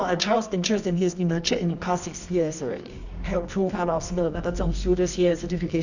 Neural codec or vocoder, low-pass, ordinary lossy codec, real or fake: codec, 24 kHz, 0.9 kbps, WavTokenizer, medium music audio release; 7.2 kHz; none; fake